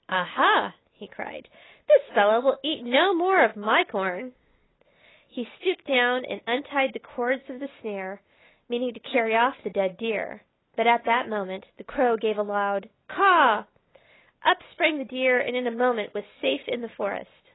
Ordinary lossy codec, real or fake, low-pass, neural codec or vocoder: AAC, 16 kbps; real; 7.2 kHz; none